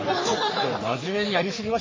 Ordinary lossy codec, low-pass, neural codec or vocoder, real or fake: MP3, 32 kbps; 7.2 kHz; codec, 44.1 kHz, 3.4 kbps, Pupu-Codec; fake